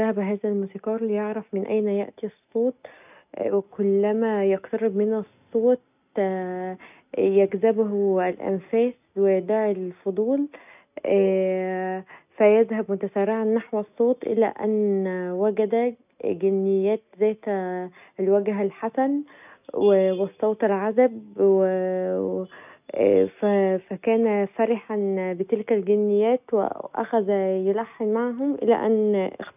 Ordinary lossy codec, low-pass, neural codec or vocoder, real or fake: none; 3.6 kHz; none; real